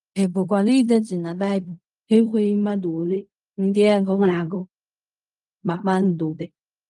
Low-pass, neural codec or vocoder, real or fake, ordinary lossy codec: 10.8 kHz; codec, 16 kHz in and 24 kHz out, 0.4 kbps, LongCat-Audio-Codec, fine tuned four codebook decoder; fake; Opus, 32 kbps